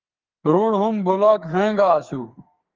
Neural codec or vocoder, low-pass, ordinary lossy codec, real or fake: codec, 44.1 kHz, 2.6 kbps, SNAC; 7.2 kHz; Opus, 32 kbps; fake